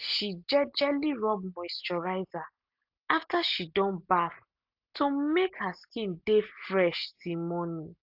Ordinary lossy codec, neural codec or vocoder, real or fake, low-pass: AAC, 48 kbps; none; real; 5.4 kHz